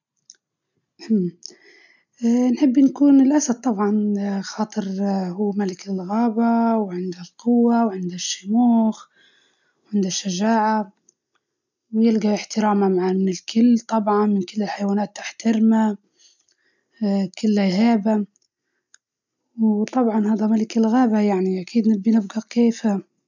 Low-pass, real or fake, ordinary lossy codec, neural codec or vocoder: 7.2 kHz; real; none; none